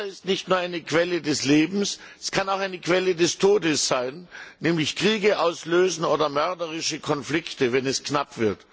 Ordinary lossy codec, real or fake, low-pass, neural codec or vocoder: none; real; none; none